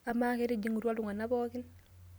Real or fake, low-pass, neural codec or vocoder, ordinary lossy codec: real; none; none; none